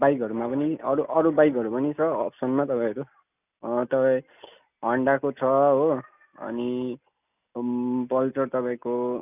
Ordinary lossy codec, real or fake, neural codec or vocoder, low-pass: none; real; none; 3.6 kHz